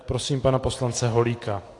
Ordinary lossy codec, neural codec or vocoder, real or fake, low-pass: AAC, 48 kbps; none; real; 10.8 kHz